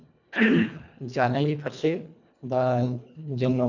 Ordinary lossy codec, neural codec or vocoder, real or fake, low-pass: none; codec, 24 kHz, 1.5 kbps, HILCodec; fake; 7.2 kHz